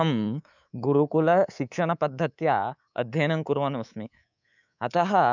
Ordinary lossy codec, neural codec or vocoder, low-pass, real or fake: none; codec, 24 kHz, 3.1 kbps, DualCodec; 7.2 kHz; fake